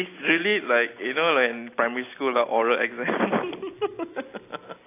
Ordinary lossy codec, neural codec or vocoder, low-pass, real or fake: AAC, 24 kbps; none; 3.6 kHz; real